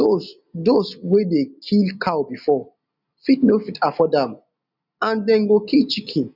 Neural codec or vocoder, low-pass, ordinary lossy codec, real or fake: none; 5.4 kHz; none; real